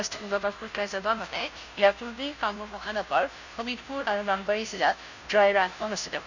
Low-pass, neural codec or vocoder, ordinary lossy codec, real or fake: 7.2 kHz; codec, 16 kHz, 0.5 kbps, FunCodec, trained on Chinese and English, 25 frames a second; none; fake